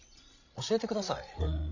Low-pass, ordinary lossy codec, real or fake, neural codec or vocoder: 7.2 kHz; none; fake; codec, 16 kHz, 16 kbps, FreqCodec, larger model